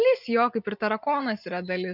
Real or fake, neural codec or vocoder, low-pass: real; none; 5.4 kHz